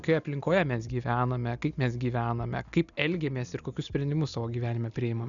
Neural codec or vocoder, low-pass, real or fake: none; 7.2 kHz; real